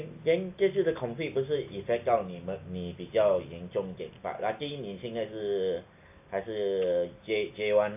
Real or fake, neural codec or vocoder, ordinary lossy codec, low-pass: real; none; AAC, 32 kbps; 3.6 kHz